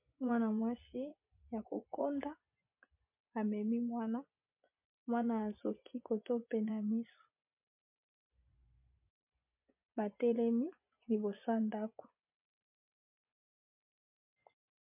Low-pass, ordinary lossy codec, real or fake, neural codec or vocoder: 3.6 kHz; MP3, 32 kbps; fake; vocoder, 24 kHz, 100 mel bands, Vocos